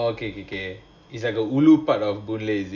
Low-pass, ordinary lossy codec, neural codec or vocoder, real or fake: 7.2 kHz; Opus, 64 kbps; none; real